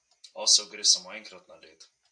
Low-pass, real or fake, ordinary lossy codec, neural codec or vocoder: 9.9 kHz; real; AAC, 64 kbps; none